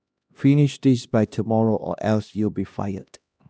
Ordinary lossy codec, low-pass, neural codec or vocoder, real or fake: none; none; codec, 16 kHz, 1 kbps, X-Codec, HuBERT features, trained on LibriSpeech; fake